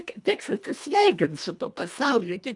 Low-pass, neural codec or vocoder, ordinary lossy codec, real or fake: 10.8 kHz; codec, 24 kHz, 1.5 kbps, HILCodec; MP3, 96 kbps; fake